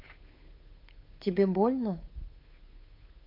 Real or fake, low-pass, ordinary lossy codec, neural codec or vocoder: fake; 5.4 kHz; MP3, 32 kbps; codec, 16 kHz, 8 kbps, FunCodec, trained on Chinese and English, 25 frames a second